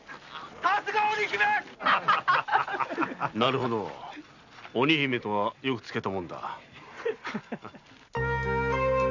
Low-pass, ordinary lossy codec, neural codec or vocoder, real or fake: 7.2 kHz; none; none; real